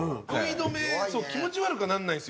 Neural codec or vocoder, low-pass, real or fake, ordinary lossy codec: none; none; real; none